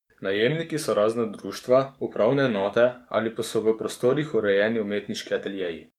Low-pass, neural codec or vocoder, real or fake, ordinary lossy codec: 19.8 kHz; codec, 44.1 kHz, 7.8 kbps, DAC; fake; MP3, 96 kbps